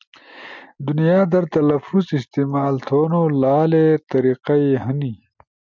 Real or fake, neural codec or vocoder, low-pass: real; none; 7.2 kHz